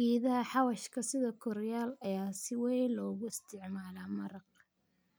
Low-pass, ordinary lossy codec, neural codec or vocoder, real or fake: none; none; none; real